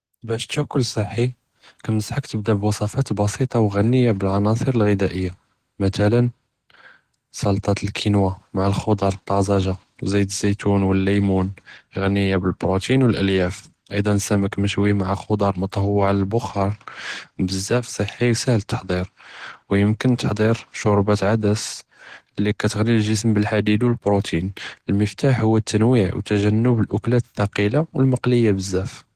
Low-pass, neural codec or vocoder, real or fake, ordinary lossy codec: 14.4 kHz; none; real; Opus, 16 kbps